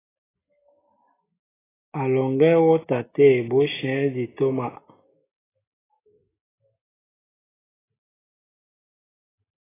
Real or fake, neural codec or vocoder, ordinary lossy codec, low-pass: real; none; AAC, 16 kbps; 3.6 kHz